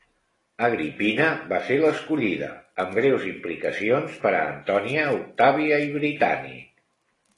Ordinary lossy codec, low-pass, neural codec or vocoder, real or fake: AAC, 32 kbps; 10.8 kHz; none; real